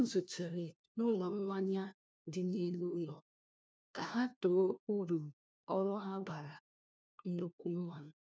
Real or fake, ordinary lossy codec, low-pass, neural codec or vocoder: fake; none; none; codec, 16 kHz, 1 kbps, FunCodec, trained on LibriTTS, 50 frames a second